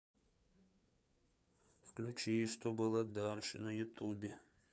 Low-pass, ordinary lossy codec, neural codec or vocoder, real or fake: none; none; codec, 16 kHz, 4 kbps, FreqCodec, larger model; fake